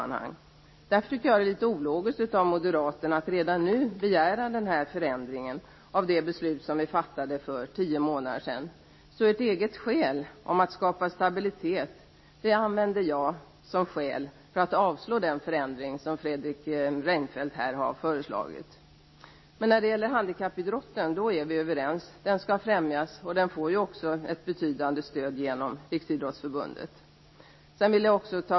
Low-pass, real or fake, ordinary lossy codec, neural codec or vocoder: 7.2 kHz; real; MP3, 24 kbps; none